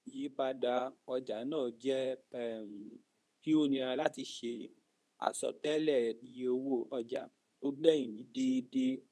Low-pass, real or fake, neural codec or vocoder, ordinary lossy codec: none; fake; codec, 24 kHz, 0.9 kbps, WavTokenizer, medium speech release version 2; none